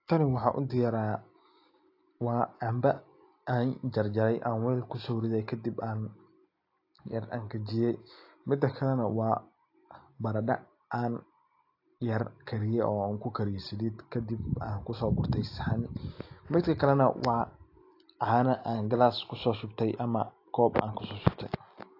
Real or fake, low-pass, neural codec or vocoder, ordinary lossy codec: real; 5.4 kHz; none; AAC, 32 kbps